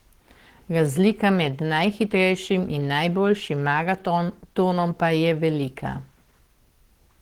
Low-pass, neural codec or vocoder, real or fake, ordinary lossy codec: 19.8 kHz; codec, 44.1 kHz, 7.8 kbps, Pupu-Codec; fake; Opus, 16 kbps